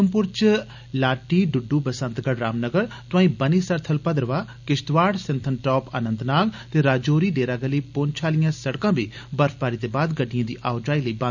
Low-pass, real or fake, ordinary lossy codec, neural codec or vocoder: 7.2 kHz; real; none; none